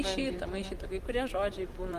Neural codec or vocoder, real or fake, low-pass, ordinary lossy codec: vocoder, 44.1 kHz, 128 mel bands, Pupu-Vocoder; fake; 14.4 kHz; Opus, 24 kbps